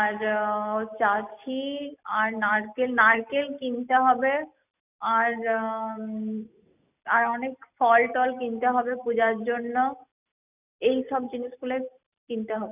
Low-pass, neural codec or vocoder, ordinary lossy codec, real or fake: 3.6 kHz; none; none; real